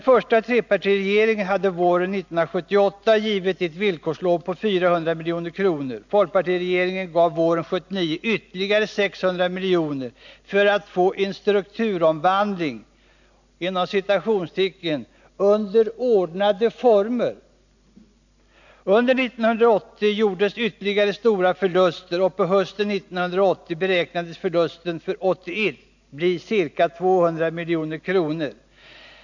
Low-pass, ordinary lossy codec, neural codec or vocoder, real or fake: 7.2 kHz; none; none; real